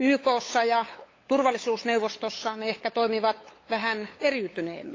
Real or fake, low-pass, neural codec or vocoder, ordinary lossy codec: fake; 7.2 kHz; codec, 16 kHz, 8 kbps, FunCodec, trained on LibriTTS, 25 frames a second; AAC, 32 kbps